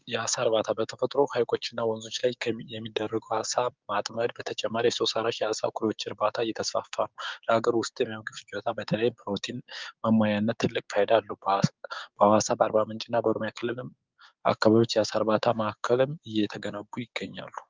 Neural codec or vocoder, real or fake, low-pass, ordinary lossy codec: codec, 16 kHz, 6 kbps, DAC; fake; 7.2 kHz; Opus, 32 kbps